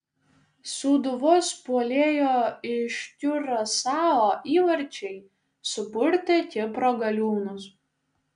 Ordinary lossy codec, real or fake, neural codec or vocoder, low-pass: AAC, 96 kbps; real; none; 9.9 kHz